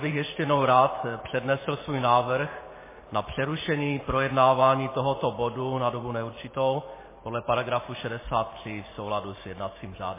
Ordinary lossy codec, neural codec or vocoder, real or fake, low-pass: MP3, 16 kbps; vocoder, 44.1 kHz, 128 mel bands every 512 samples, BigVGAN v2; fake; 3.6 kHz